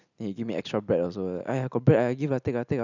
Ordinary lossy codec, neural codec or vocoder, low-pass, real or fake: none; none; 7.2 kHz; real